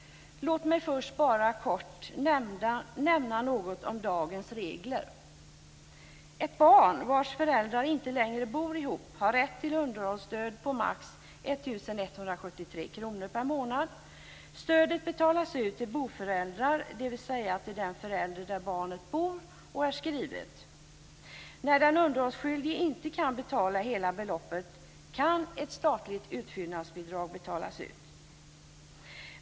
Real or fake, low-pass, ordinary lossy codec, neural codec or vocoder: real; none; none; none